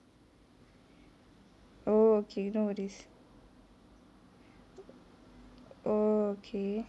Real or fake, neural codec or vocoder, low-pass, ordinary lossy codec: real; none; none; none